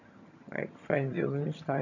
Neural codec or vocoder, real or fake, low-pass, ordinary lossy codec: vocoder, 22.05 kHz, 80 mel bands, HiFi-GAN; fake; 7.2 kHz; none